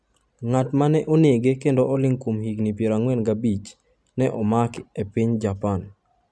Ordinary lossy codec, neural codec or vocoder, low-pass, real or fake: none; none; none; real